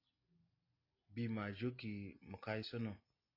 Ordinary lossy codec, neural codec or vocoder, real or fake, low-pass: AAC, 32 kbps; none; real; 5.4 kHz